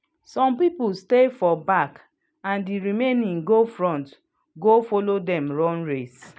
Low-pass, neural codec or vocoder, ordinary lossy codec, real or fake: none; none; none; real